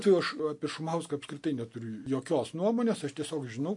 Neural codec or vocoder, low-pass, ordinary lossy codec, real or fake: none; 10.8 kHz; MP3, 48 kbps; real